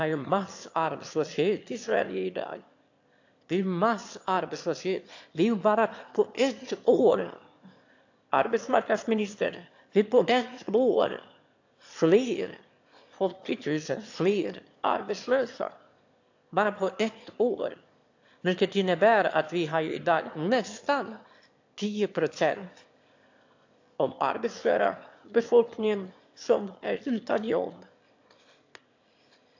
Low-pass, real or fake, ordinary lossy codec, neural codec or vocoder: 7.2 kHz; fake; AAC, 48 kbps; autoencoder, 22.05 kHz, a latent of 192 numbers a frame, VITS, trained on one speaker